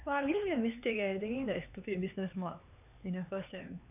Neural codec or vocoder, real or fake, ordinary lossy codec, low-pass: codec, 16 kHz, 4 kbps, X-Codec, HuBERT features, trained on LibriSpeech; fake; none; 3.6 kHz